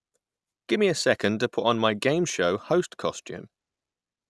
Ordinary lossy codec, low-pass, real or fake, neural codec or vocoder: none; none; real; none